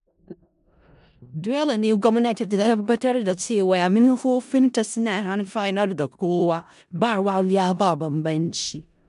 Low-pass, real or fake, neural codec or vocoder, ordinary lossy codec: 10.8 kHz; fake; codec, 16 kHz in and 24 kHz out, 0.4 kbps, LongCat-Audio-Codec, four codebook decoder; MP3, 96 kbps